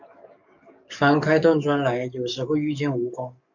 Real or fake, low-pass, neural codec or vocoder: fake; 7.2 kHz; codec, 44.1 kHz, 7.8 kbps, DAC